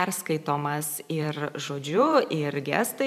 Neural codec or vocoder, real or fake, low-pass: vocoder, 44.1 kHz, 128 mel bands every 512 samples, BigVGAN v2; fake; 14.4 kHz